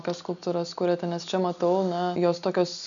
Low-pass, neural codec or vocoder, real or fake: 7.2 kHz; none; real